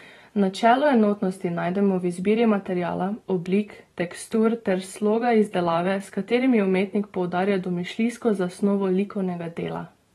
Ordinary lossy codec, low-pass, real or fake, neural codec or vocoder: AAC, 32 kbps; 19.8 kHz; fake; vocoder, 44.1 kHz, 128 mel bands every 512 samples, BigVGAN v2